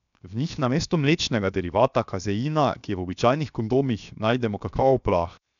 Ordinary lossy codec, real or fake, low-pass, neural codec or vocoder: none; fake; 7.2 kHz; codec, 16 kHz, 0.7 kbps, FocalCodec